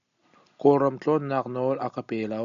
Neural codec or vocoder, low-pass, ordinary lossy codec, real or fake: none; 7.2 kHz; AAC, 96 kbps; real